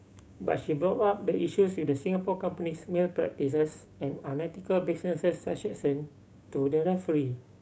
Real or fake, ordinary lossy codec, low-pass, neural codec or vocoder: fake; none; none; codec, 16 kHz, 6 kbps, DAC